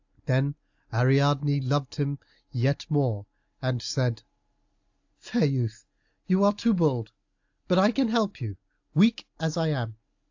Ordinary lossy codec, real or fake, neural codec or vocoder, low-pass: AAC, 48 kbps; real; none; 7.2 kHz